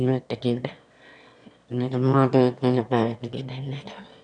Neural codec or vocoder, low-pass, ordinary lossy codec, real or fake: autoencoder, 22.05 kHz, a latent of 192 numbers a frame, VITS, trained on one speaker; 9.9 kHz; none; fake